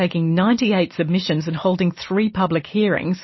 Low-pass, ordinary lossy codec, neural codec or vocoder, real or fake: 7.2 kHz; MP3, 24 kbps; none; real